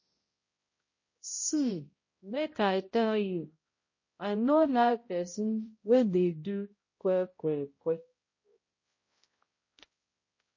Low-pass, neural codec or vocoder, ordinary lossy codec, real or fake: 7.2 kHz; codec, 16 kHz, 0.5 kbps, X-Codec, HuBERT features, trained on balanced general audio; MP3, 32 kbps; fake